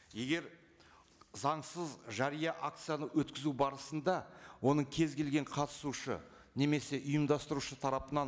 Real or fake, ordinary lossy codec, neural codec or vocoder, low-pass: real; none; none; none